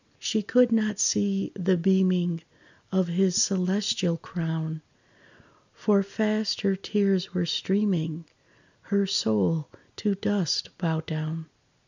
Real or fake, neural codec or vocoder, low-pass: real; none; 7.2 kHz